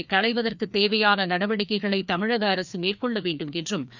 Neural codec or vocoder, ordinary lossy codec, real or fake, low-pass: codec, 16 kHz, 2 kbps, FreqCodec, larger model; none; fake; 7.2 kHz